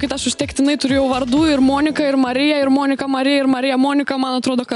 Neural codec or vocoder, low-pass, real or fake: none; 10.8 kHz; real